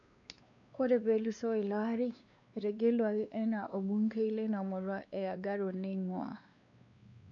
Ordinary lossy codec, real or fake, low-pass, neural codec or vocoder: none; fake; 7.2 kHz; codec, 16 kHz, 2 kbps, X-Codec, WavLM features, trained on Multilingual LibriSpeech